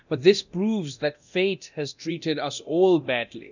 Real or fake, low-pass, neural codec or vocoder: fake; 7.2 kHz; codec, 24 kHz, 0.9 kbps, DualCodec